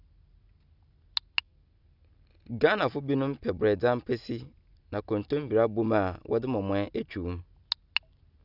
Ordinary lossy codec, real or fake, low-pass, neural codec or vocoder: none; real; 5.4 kHz; none